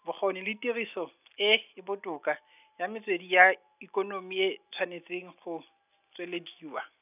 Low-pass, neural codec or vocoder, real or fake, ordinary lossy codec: 3.6 kHz; none; real; none